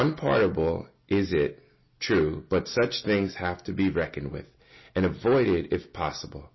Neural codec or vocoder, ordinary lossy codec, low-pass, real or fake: none; MP3, 24 kbps; 7.2 kHz; real